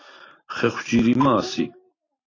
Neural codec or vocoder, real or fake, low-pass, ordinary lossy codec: vocoder, 44.1 kHz, 128 mel bands every 512 samples, BigVGAN v2; fake; 7.2 kHz; AAC, 32 kbps